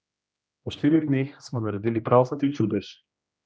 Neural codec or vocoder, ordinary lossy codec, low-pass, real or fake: codec, 16 kHz, 1 kbps, X-Codec, HuBERT features, trained on general audio; none; none; fake